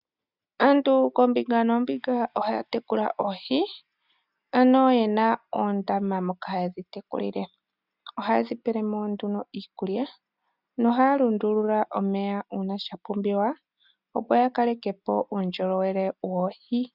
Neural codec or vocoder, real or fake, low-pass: none; real; 5.4 kHz